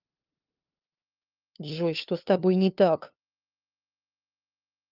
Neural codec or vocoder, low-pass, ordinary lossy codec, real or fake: codec, 16 kHz, 2 kbps, FunCodec, trained on LibriTTS, 25 frames a second; 5.4 kHz; Opus, 24 kbps; fake